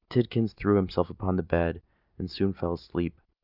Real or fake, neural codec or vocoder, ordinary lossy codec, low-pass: real; none; Opus, 64 kbps; 5.4 kHz